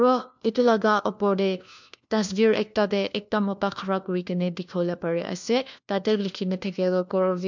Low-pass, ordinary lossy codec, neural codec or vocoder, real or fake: 7.2 kHz; MP3, 64 kbps; codec, 16 kHz, 1 kbps, FunCodec, trained on LibriTTS, 50 frames a second; fake